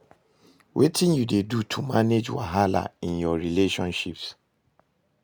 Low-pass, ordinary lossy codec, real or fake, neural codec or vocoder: none; none; real; none